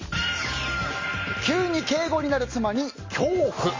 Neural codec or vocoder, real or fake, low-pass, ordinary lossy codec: none; real; 7.2 kHz; MP3, 32 kbps